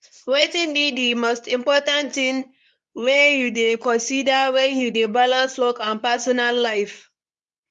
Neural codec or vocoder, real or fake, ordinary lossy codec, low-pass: codec, 24 kHz, 0.9 kbps, WavTokenizer, medium speech release version 2; fake; none; none